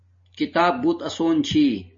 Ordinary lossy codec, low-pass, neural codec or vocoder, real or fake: MP3, 32 kbps; 7.2 kHz; none; real